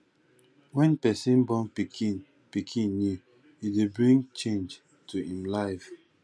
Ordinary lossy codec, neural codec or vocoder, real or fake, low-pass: none; none; real; none